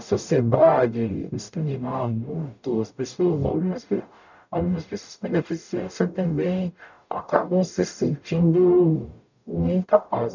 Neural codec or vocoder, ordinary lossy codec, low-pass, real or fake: codec, 44.1 kHz, 0.9 kbps, DAC; none; 7.2 kHz; fake